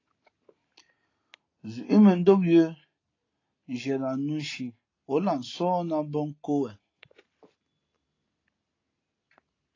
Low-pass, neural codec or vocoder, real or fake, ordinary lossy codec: 7.2 kHz; none; real; AAC, 32 kbps